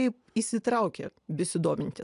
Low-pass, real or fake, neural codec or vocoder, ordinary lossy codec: 10.8 kHz; real; none; AAC, 96 kbps